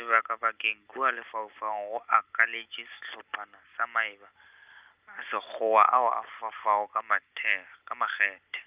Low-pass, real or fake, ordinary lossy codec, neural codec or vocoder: 3.6 kHz; real; Opus, 24 kbps; none